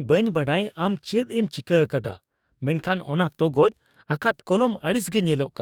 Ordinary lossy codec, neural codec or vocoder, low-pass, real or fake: none; codec, 44.1 kHz, 2.6 kbps, DAC; 19.8 kHz; fake